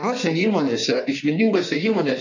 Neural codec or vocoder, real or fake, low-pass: codec, 16 kHz in and 24 kHz out, 1.1 kbps, FireRedTTS-2 codec; fake; 7.2 kHz